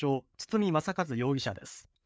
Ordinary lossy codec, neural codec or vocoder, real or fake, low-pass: none; codec, 16 kHz, 4 kbps, FreqCodec, larger model; fake; none